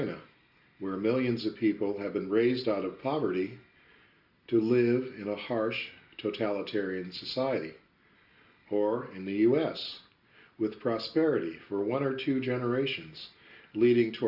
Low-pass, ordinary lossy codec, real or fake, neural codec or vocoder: 5.4 kHz; Opus, 64 kbps; real; none